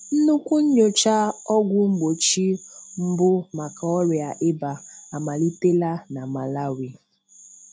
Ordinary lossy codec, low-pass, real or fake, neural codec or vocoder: none; none; real; none